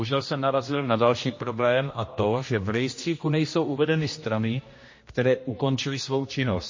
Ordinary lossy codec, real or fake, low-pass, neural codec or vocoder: MP3, 32 kbps; fake; 7.2 kHz; codec, 16 kHz, 1 kbps, X-Codec, HuBERT features, trained on general audio